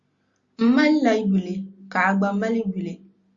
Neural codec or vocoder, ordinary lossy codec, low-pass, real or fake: none; Opus, 64 kbps; 7.2 kHz; real